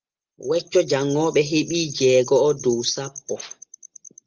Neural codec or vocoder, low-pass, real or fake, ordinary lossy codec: none; 7.2 kHz; real; Opus, 32 kbps